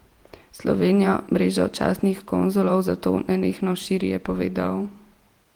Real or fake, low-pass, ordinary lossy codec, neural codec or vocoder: fake; 19.8 kHz; Opus, 24 kbps; vocoder, 48 kHz, 128 mel bands, Vocos